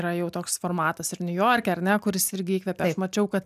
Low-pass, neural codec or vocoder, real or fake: 14.4 kHz; none; real